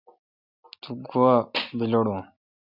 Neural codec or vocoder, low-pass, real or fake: none; 5.4 kHz; real